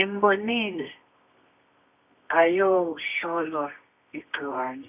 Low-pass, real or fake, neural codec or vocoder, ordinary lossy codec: 3.6 kHz; fake; codec, 24 kHz, 0.9 kbps, WavTokenizer, medium music audio release; none